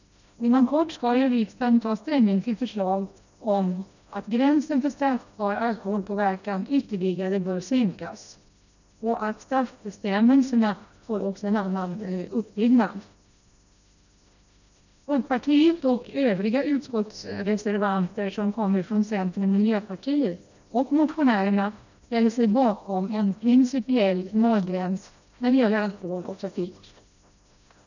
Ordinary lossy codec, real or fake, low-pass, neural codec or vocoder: none; fake; 7.2 kHz; codec, 16 kHz, 1 kbps, FreqCodec, smaller model